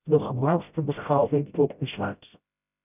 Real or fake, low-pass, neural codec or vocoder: fake; 3.6 kHz; codec, 16 kHz, 0.5 kbps, FreqCodec, smaller model